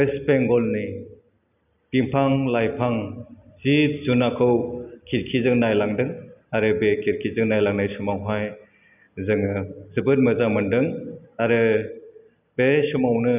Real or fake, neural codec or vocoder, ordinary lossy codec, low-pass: real; none; none; 3.6 kHz